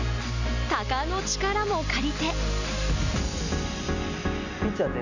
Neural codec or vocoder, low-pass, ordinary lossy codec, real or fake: none; 7.2 kHz; none; real